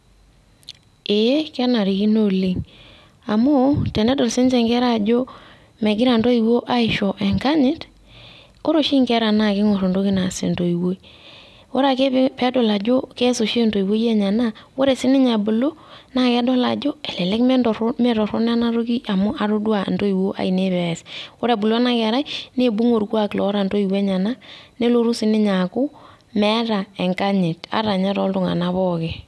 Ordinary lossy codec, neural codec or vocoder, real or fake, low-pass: none; none; real; none